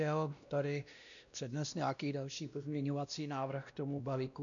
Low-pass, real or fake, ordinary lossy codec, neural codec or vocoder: 7.2 kHz; fake; MP3, 96 kbps; codec, 16 kHz, 1 kbps, X-Codec, WavLM features, trained on Multilingual LibriSpeech